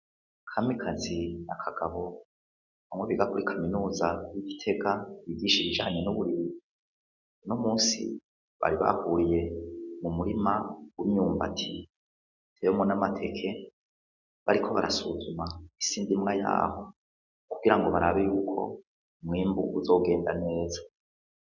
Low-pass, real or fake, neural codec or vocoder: 7.2 kHz; real; none